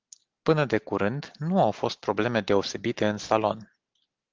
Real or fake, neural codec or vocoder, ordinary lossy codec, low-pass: real; none; Opus, 16 kbps; 7.2 kHz